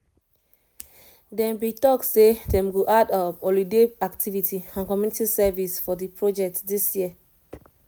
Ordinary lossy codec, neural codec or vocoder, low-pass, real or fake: none; none; none; real